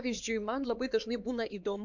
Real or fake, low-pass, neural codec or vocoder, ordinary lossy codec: fake; 7.2 kHz; codec, 16 kHz, 4 kbps, X-Codec, HuBERT features, trained on LibriSpeech; MP3, 64 kbps